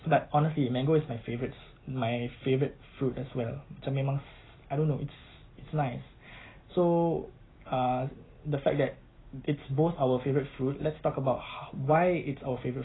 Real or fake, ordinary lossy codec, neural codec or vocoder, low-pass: real; AAC, 16 kbps; none; 7.2 kHz